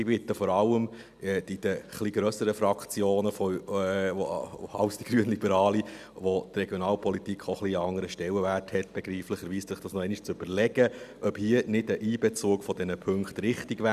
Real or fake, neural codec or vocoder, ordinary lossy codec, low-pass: real; none; none; 14.4 kHz